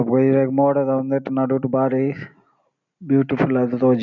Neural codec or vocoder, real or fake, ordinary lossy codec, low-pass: none; real; none; 7.2 kHz